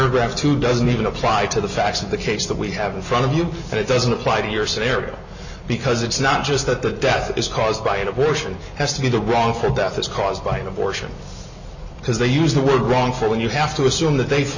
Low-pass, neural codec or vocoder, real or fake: 7.2 kHz; none; real